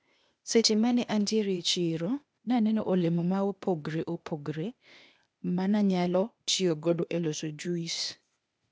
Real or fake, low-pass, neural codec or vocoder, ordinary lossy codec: fake; none; codec, 16 kHz, 0.8 kbps, ZipCodec; none